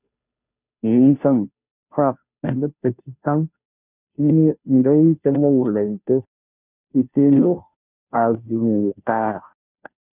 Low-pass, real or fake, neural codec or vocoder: 3.6 kHz; fake; codec, 16 kHz, 0.5 kbps, FunCodec, trained on Chinese and English, 25 frames a second